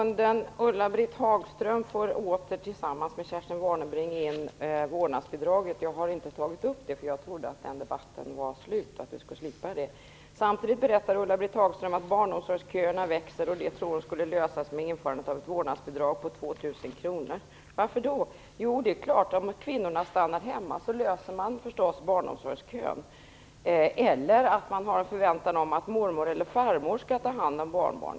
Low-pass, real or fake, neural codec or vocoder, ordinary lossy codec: none; real; none; none